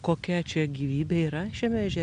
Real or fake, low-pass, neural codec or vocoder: real; 9.9 kHz; none